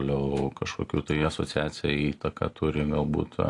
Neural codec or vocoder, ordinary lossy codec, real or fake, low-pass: none; AAC, 48 kbps; real; 10.8 kHz